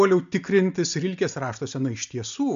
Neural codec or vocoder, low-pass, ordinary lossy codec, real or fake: none; 7.2 kHz; MP3, 64 kbps; real